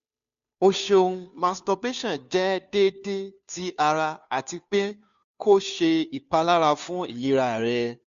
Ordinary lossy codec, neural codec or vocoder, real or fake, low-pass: AAC, 96 kbps; codec, 16 kHz, 2 kbps, FunCodec, trained on Chinese and English, 25 frames a second; fake; 7.2 kHz